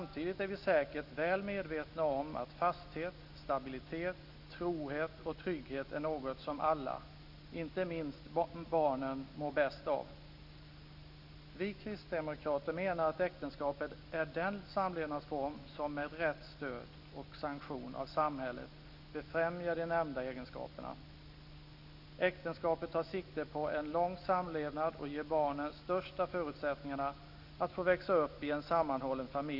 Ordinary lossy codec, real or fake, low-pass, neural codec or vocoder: none; real; 5.4 kHz; none